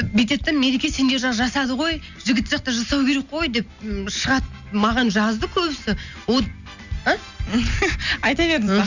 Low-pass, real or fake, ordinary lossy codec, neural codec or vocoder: 7.2 kHz; real; none; none